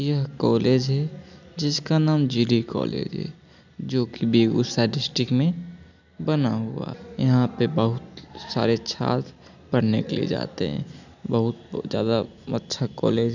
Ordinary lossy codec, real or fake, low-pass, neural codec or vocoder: none; real; 7.2 kHz; none